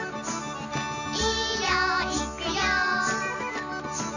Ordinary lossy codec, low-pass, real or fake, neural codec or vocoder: none; 7.2 kHz; real; none